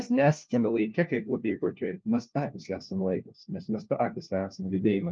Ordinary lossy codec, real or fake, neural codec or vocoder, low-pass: Opus, 24 kbps; fake; codec, 16 kHz, 1 kbps, FunCodec, trained on LibriTTS, 50 frames a second; 7.2 kHz